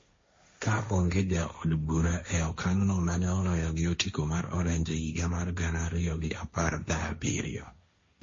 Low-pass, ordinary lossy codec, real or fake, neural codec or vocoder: 7.2 kHz; MP3, 32 kbps; fake; codec, 16 kHz, 1.1 kbps, Voila-Tokenizer